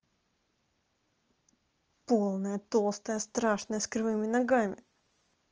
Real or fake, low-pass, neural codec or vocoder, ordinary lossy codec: real; 7.2 kHz; none; Opus, 24 kbps